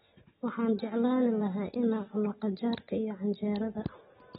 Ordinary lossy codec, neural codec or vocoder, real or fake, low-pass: AAC, 16 kbps; none; real; 10.8 kHz